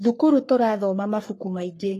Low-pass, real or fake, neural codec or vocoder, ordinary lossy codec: 14.4 kHz; fake; codec, 44.1 kHz, 3.4 kbps, Pupu-Codec; AAC, 48 kbps